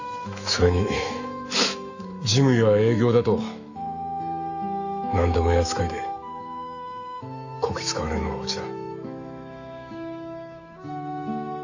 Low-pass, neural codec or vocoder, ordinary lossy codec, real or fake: 7.2 kHz; autoencoder, 48 kHz, 128 numbers a frame, DAC-VAE, trained on Japanese speech; AAC, 48 kbps; fake